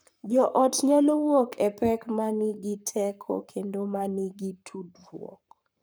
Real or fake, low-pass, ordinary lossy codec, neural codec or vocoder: fake; none; none; codec, 44.1 kHz, 7.8 kbps, Pupu-Codec